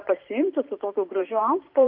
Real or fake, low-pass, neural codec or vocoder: fake; 5.4 kHz; vocoder, 44.1 kHz, 128 mel bands every 512 samples, BigVGAN v2